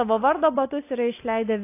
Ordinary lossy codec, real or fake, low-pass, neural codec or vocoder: AAC, 24 kbps; real; 3.6 kHz; none